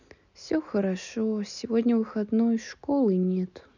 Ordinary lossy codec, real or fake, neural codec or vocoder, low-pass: none; real; none; 7.2 kHz